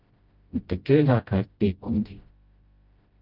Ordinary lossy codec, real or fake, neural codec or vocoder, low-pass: Opus, 32 kbps; fake; codec, 16 kHz, 0.5 kbps, FreqCodec, smaller model; 5.4 kHz